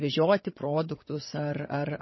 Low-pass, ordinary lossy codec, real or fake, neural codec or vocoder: 7.2 kHz; MP3, 24 kbps; fake; vocoder, 22.05 kHz, 80 mel bands, WaveNeXt